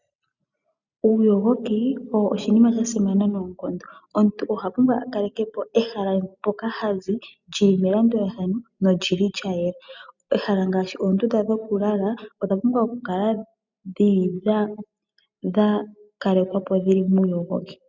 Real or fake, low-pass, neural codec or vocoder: real; 7.2 kHz; none